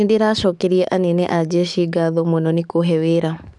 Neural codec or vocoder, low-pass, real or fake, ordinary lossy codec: codec, 44.1 kHz, 7.8 kbps, DAC; 10.8 kHz; fake; none